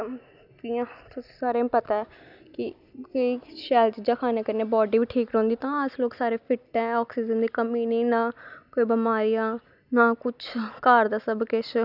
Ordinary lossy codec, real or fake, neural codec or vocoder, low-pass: none; real; none; 5.4 kHz